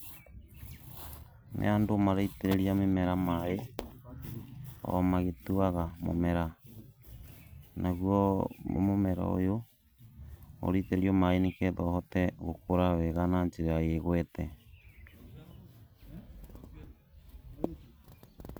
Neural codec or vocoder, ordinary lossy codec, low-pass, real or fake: vocoder, 44.1 kHz, 128 mel bands every 256 samples, BigVGAN v2; none; none; fake